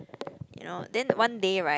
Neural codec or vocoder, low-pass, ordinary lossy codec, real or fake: none; none; none; real